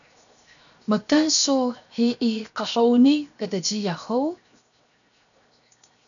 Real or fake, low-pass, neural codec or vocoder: fake; 7.2 kHz; codec, 16 kHz, 0.7 kbps, FocalCodec